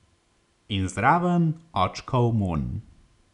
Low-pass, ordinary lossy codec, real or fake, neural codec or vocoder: 10.8 kHz; none; real; none